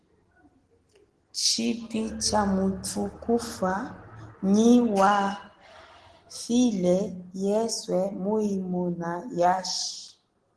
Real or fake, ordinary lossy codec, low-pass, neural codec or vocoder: real; Opus, 16 kbps; 9.9 kHz; none